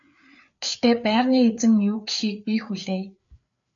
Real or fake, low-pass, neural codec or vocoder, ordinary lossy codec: fake; 7.2 kHz; codec, 16 kHz, 4 kbps, FreqCodec, larger model; AAC, 64 kbps